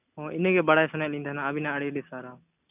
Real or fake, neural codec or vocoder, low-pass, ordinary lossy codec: real; none; 3.6 kHz; none